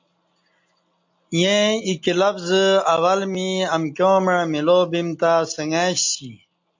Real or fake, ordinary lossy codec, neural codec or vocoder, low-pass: real; MP3, 48 kbps; none; 7.2 kHz